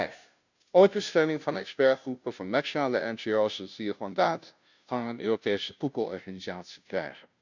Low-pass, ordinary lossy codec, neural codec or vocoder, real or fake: 7.2 kHz; none; codec, 16 kHz, 0.5 kbps, FunCodec, trained on Chinese and English, 25 frames a second; fake